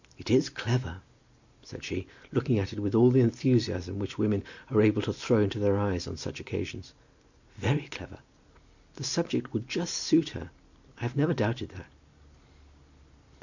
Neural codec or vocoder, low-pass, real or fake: none; 7.2 kHz; real